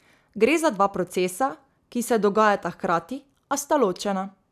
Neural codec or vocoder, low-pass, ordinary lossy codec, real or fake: none; 14.4 kHz; none; real